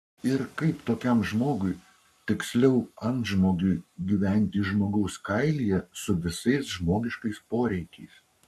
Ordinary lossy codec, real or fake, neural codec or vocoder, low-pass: AAC, 96 kbps; fake; codec, 44.1 kHz, 7.8 kbps, Pupu-Codec; 14.4 kHz